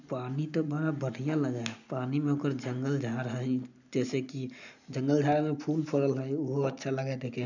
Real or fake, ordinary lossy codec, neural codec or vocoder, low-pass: real; none; none; 7.2 kHz